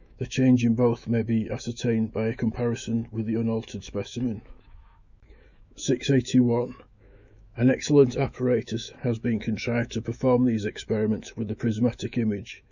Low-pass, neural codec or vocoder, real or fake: 7.2 kHz; autoencoder, 48 kHz, 128 numbers a frame, DAC-VAE, trained on Japanese speech; fake